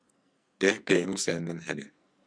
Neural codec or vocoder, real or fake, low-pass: codec, 44.1 kHz, 2.6 kbps, SNAC; fake; 9.9 kHz